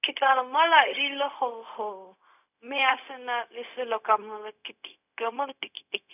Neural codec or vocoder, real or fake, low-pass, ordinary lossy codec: codec, 16 kHz, 0.4 kbps, LongCat-Audio-Codec; fake; 3.6 kHz; none